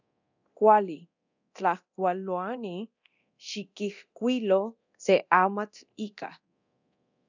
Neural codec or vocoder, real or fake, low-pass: codec, 24 kHz, 0.9 kbps, DualCodec; fake; 7.2 kHz